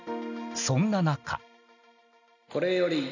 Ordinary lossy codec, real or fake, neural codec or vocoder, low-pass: none; real; none; 7.2 kHz